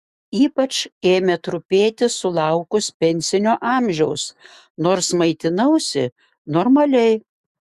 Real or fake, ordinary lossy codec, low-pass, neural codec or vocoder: fake; Opus, 64 kbps; 14.4 kHz; autoencoder, 48 kHz, 128 numbers a frame, DAC-VAE, trained on Japanese speech